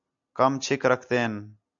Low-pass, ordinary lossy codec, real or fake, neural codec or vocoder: 7.2 kHz; AAC, 64 kbps; real; none